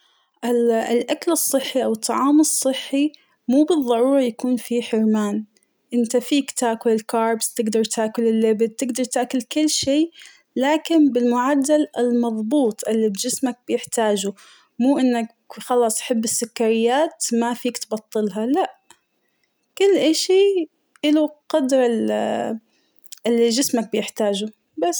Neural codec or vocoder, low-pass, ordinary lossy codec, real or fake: none; none; none; real